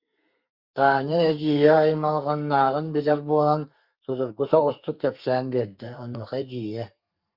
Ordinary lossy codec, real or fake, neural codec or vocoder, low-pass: Opus, 64 kbps; fake; codec, 32 kHz, 1.9 kbps, SNAC; 5.4 kHz